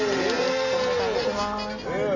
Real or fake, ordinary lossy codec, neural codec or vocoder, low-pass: real; none; none; 7.2 kHz